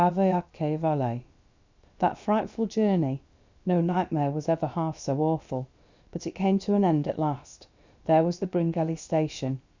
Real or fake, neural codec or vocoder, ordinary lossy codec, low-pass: fake; codec, 16 kHz, about 1 kbps, DyCAST, with the encoder's durations; Opus, 64 kbps; 7.2 kHz